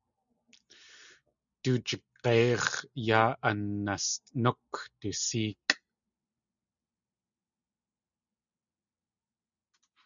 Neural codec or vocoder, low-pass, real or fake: none; 7.2 kHz; real